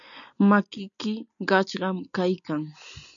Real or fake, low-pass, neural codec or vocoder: real; 7.2 kHz; none